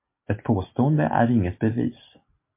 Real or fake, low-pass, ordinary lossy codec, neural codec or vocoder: real; 3.6 kHz; MP3, 24 kbps; none